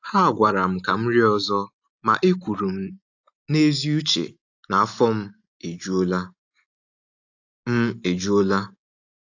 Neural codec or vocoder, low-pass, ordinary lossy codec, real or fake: none; 7.2 kHz; AAC, 48 kbps; real